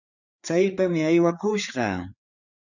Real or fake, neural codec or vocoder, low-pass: fake; codec, 16 kHz, 4 kbps, FreqCodec, larger model; 7.2 kHz